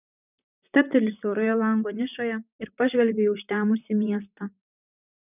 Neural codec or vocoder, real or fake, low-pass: vocoder, 44.1 kHz, 128 mel bands, Pupu-Vocoder; fake; 3.6 kHz